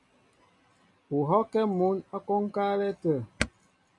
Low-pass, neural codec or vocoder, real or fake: 10.8 kHz; none; real